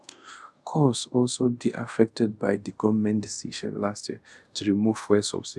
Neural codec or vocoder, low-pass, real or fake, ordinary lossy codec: codec, 24 kHz, 0.5 kbps, DualCodec; none; fake; none